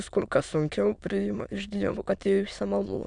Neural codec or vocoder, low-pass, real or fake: autoencoder, 22.05 kHz, a latent of 192 numbers a frame, VITS, trained on many speakers; 9.9 kHz; fake